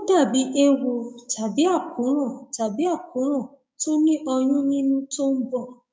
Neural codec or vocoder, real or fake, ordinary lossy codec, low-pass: codec, 16 kHz, 6 kbps, DAC; fake; none; none